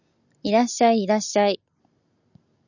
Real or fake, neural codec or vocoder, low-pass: real; none; 7.2 kHz